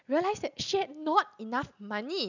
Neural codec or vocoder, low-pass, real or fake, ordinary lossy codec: none; 7.2 kHz; real; none